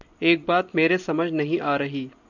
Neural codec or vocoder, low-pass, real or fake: none; 7.2 kHz; real